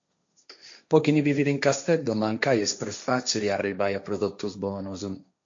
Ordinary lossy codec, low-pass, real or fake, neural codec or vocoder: MP3, 48 kbps; 7.2 kHz; fake; codec, 16 kHz, 1.1 kbps, Voila-Tokenizer